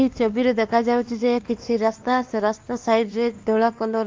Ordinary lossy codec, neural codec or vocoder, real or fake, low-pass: Opus, 32 kbps; codec, 16 kHz, 2 kbps, X-Codec, WavLM features, trained on Multilingual LibriSpeech; fake; 7.2 kHz